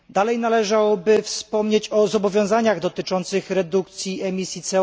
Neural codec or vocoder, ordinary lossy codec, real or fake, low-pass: none; none; real; none